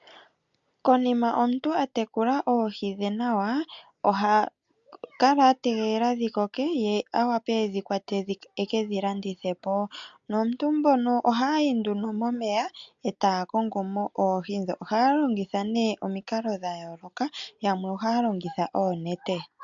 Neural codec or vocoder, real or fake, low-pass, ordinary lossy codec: none; real; 7.2 kHz; MP3, 64 kbps